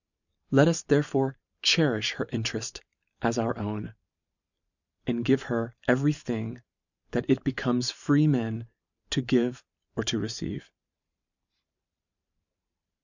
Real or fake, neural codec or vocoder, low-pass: fake; vocoder, 44.1 kHz, 128 mel bands every 512 samples, BigVGAN v2; 7.2 kHz